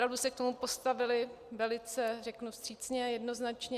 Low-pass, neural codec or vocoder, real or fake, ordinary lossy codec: 14.4 kHz; none; real; Opus, 64 kbps